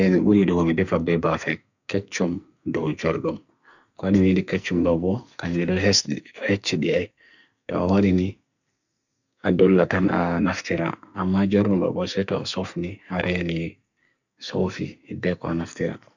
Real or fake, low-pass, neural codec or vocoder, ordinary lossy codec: fake; 7.2 kHz; codec, 44.1 kHz, 2.6 kbps, SNAC; none